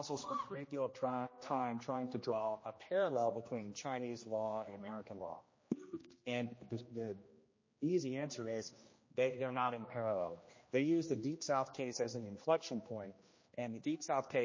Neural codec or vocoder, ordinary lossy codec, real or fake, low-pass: codec, 16 kHz, 1 kbps, X-Codec, HuBERT features, trained on general audio; MP3, 32 kbps; fake; 7.2 kHz